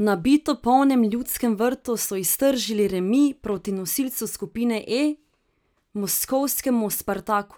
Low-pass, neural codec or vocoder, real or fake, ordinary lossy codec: none; none; real; none